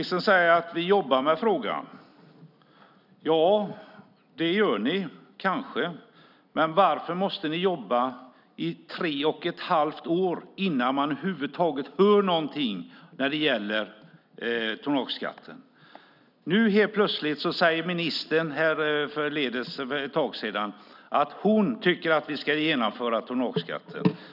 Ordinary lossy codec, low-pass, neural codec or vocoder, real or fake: none; 5.4 kHz; none; real